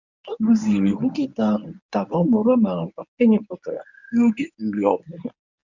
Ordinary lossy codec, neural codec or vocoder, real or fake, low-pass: none; codec, 24 kHz, 0.9 kbps, WavTokenizer, medium speech release version 1; fake; 7.2 kHz